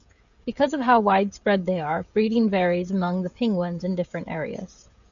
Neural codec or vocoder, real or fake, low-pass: codec, 16 kHz, 16 kbps, FreqCodec, smaller model; fake; 7.2 kHz